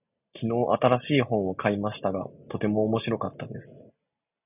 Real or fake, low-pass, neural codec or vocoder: real; 3.6 kHz; none